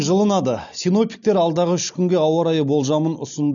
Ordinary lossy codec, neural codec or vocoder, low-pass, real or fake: none; none; 7.2 kHz; real